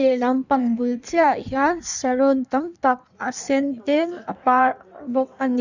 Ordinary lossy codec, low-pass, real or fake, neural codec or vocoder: none; 7.2 kHz; fake; codec, 16 kHz in and 24 kHz out, 1.1 kbps, FireRedTTS-2 codec